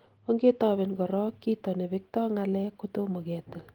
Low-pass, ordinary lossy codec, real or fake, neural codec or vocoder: 19.8 kHz; Opus, 24 kbps; real; none